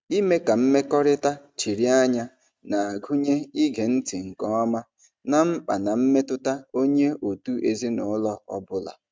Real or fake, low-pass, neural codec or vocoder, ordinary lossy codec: real; none; none; none